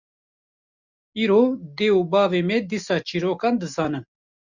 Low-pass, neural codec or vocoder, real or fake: 7.2 kHz; none; real